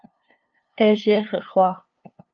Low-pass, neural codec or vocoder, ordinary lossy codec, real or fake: 7.2 kHz; codec, 16 kHz, 2 kbps, FunCodec, trained on LibriTTS, 25 frames a second; Opus, 24 kbps; fake